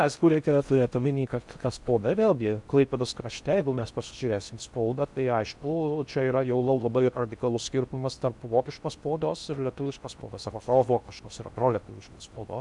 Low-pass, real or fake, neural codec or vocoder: 10.8 kHz; fake; codec, 16 kHz in and 24 kHz out, 0.6 kbps, FocalCodec, streaming, 4096 codes